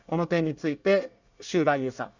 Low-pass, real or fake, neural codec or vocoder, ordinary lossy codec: 7.2 kHz; fake; codec, 24 kHz, 1 kbps, SNAC; none